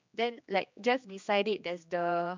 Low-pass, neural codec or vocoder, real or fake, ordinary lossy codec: 7.2 kHz; codec, 16 kHz, 2 kbps, X-Codec, HuBERT features, trained on general audio; fake; MP3, 64 kbps